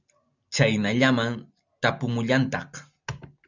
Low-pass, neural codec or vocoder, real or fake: 7.2 kHz; none; real